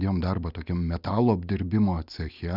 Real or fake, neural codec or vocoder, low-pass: real; none; 5.4 kHz